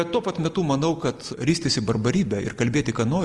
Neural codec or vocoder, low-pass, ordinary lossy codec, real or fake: none; 10.8 kHz; Opus, 24 kbps; real